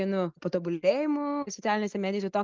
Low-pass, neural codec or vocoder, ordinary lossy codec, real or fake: 7.2 kHz; none; Opus, 32 kbps; real